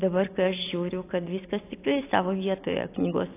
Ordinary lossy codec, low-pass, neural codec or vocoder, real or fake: AAC, 24 kbps; 3.6 kHz; none; real